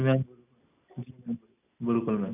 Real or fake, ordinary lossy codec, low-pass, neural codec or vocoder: real; none; 3.6 kHz; none